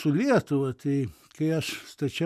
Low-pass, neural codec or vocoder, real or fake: 14.4 kHz; none; real